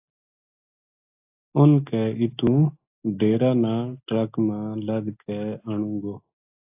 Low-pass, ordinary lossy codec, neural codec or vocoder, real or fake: 3.6 kHz; AAC, 32 kbps; none; real